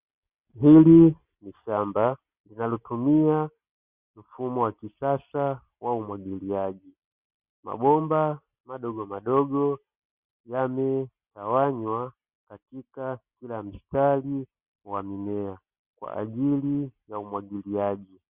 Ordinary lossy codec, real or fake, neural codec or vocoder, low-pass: Opus, 64 kbps; real; none; 3.6 kHz